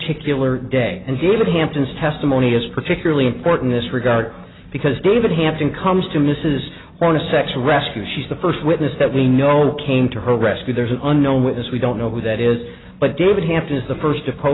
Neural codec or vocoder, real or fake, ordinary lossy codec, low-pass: none; real; AAC, 16 kbps; 7.2 kHz